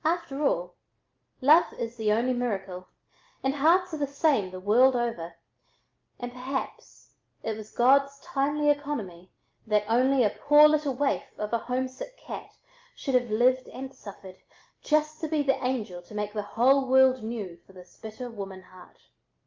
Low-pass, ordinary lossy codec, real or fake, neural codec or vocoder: 7.2 kHz; Opus, 24 kbps; real; none